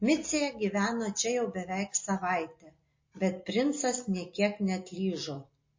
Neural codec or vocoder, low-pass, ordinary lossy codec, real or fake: none; 7.2 kHz; MP3, 32 kbps; real